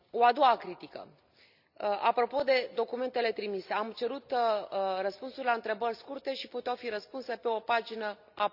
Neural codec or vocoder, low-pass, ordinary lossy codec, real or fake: none; 5.4 kHz; none; real